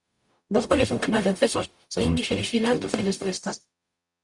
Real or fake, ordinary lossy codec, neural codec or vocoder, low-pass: fake; Opus, 64 kbps; codec, 44.1 kHz, 0.9 kbps, DAC; 10.8 kHz